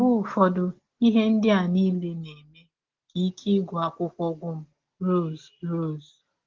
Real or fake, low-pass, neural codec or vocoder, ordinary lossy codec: real; 7.2 kHz; none; Opus, 16 kbps